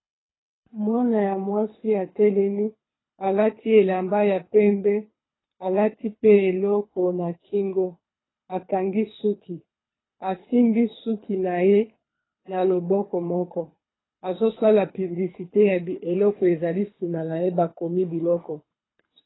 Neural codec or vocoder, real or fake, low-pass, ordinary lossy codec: codec, 24 kHz, 3 kbps, HILCodec; fake; 7.2 kHz; AAC, 16 kbps